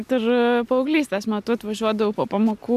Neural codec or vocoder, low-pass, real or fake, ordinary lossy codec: none; 14.4 kHz; real; AAC, 96 kbps